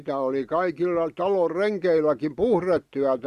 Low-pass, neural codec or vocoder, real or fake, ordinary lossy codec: 14.4 kHz; none; real; Opus, 64 kbps